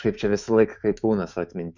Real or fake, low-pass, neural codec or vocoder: fake; 7.2 kHz; autoencoder, 48 kHz, 128 numbers a frame, DAC-VAE, trained on Japanese speech